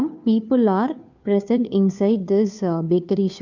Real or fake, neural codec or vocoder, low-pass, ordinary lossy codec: fake; codec, 16 kHz, 2 kbps, FunCodec, trained on Chinese and English, 25 frames a second; 7.2 kHz; none